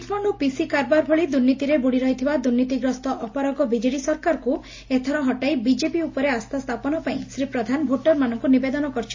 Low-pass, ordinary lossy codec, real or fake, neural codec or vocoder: 7.2 kHz; MP3, 32 kbps; real; none